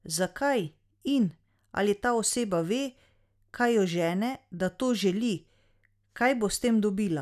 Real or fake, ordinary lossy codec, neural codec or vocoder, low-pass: real; none; none; 14.4 kHz